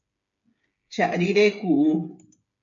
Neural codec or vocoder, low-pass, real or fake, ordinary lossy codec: codec, 16 kHz, 8 kbps, FreqCodec, smaller model; 7.2 kHz; fake; MP3, 48 kbps